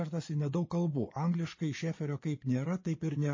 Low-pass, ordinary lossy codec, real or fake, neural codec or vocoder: 7.2 kHz; MP3, 32 kbps; fake; vocoder, 24 kHz, 100 mel bands, Vocos